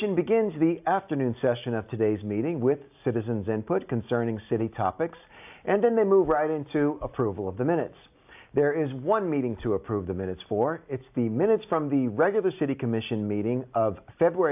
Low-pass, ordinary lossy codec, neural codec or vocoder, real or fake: 3.6 kHz; AAC, 32 kbps; none; real